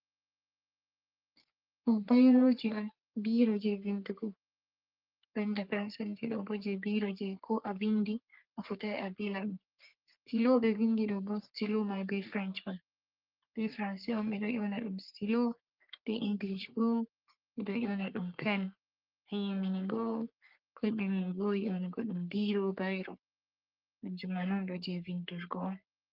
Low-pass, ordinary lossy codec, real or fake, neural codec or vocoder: 5.4 kHz; Opus, 24 kbps; fake; codec, 44.1 kHz, 3.4 kbps, Pupu-Codec